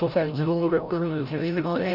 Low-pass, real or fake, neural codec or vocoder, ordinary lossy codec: 5.4 kHz; fake; codec, 16 kHz, 0.5 kbps, FreqCodec, larger model; none